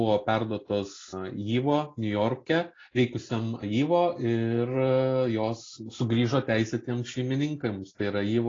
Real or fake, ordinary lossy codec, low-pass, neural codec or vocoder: real; AAC, 32 kbps; 7.2 kHz; none